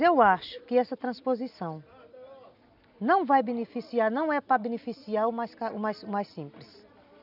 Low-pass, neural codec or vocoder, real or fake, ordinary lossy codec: 5.4 kHz; none; real; none